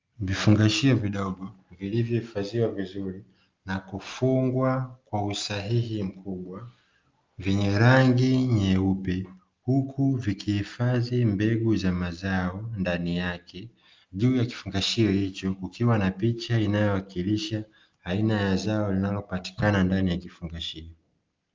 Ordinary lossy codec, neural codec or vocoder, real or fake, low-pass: Opus, 32 kbps; none; real; 7.2 kHz